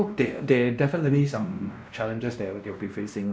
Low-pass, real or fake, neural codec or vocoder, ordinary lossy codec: none; fake; codec, 16 kHz, 1 kbps, X-Codec, WavLM features, trained on Multilingual LibriSpeech; none